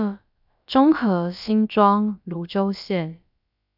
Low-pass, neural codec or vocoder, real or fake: 5.4 kHz; codec, 16 kHz, about 1 kbps, DyCAST, with the encoder's durations; fake